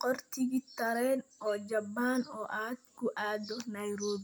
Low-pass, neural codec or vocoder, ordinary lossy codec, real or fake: none; none; none; real